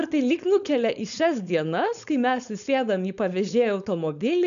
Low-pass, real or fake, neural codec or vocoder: 7.2 kHz; fake; codec, 16 kHz, 4.8 kbps, FACodec